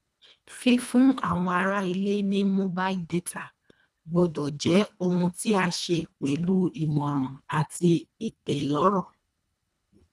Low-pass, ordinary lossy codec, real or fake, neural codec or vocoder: none; none; fake; codec, 24 kHz, 1.5 kbps, HILCodec